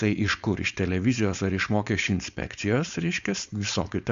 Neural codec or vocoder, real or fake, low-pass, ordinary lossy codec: codec, 16 kHz, 4.8 kbps, FACodec; fake; 7.2 kHz; Opus, 64 kbps